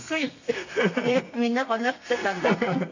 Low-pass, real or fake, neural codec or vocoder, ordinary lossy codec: 7.2 kHz; fake; codec, 32 kHz, 1.9 kbps, SNAC; AAC, 48 kbps